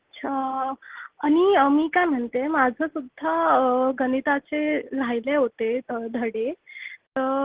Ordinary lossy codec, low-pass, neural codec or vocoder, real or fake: Opus, 16 kbps; 3.6 kHz; none; real